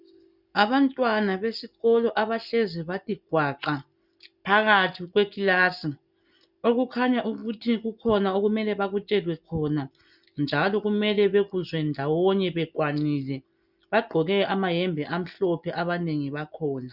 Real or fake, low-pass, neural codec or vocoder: real; 5.4 kHz; none